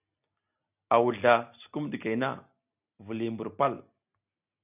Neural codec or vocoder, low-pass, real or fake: none; 3.6 kHz; real